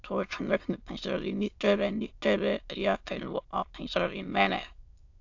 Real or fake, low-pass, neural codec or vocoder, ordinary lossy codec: fake; 7.2 kHz; autoencoder, 22.05 kHz, a latent of 192 numbers a frame, VITS, trained on many speakers; AAC, 48 kbps